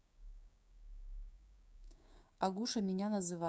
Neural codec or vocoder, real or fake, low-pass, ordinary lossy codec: codec, 16 kHz, 6 kbps, DAC; fake; none; none